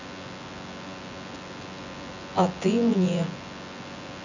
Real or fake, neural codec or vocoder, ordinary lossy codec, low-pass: fake; vocoder, 24 kHz, 100 mel bands, Vocos; none; 7.2 kHz